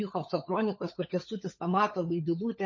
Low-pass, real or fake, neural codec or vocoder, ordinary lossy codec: 7.2 kHz; fake; codec, 16 kHz, 16 kbps, FunCodec, trained on LibriTTS, 50 frames a second; MP3, 32 kbps